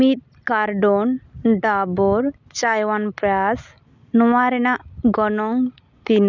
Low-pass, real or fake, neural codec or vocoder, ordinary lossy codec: 7.2 kHz; real; none; none